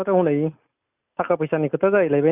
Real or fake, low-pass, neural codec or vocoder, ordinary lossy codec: real; 3.6 kHz; none; none